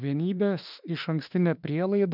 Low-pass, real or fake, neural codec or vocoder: 5.4 kHz; fake; autoencoder, 48 kHz, 32 numbers a frame, DAC-VAE, trained on Japanese speech